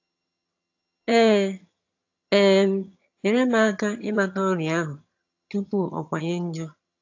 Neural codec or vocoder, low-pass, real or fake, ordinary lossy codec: vocoder, 22.05 kHz, 80 mel bands, HiFi-GAN; 7.2 kHz; fake; AAC, 48 kbps